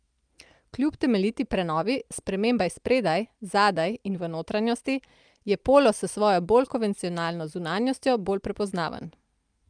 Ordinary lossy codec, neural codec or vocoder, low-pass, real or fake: Opus, 32 kbps; none; 9.9 kHz; real